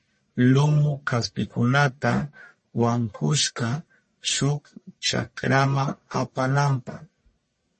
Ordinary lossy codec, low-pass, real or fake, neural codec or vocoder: MP3, 32 kbps; 10.8 kHz; fake; codec, 44.1 kHz, 1.7 kbps, Pupu-Codec